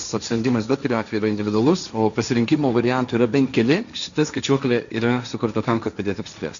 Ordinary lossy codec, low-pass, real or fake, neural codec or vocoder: MP3, 96 kbps; 7.2 kHz; fake; codec, 16 kHz, 1.1 kbps, Voila-Tokenizer